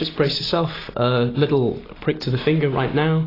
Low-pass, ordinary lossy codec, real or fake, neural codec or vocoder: 5.4 kHz; AAC, 24 kbps; real; none